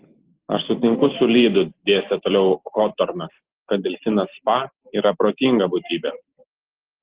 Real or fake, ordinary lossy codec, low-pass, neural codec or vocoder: real; Opus, 16 kbps; 3.6 kHz; none